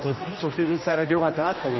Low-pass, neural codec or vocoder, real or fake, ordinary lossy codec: 7.2 kHz; codec, 16 kHz, 1 kbps, X-Codec, HuBERT features, trained on general audio; fake; MP3, 24 kbps